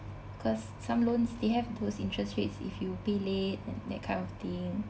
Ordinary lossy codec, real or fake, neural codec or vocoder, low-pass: none; real; none; none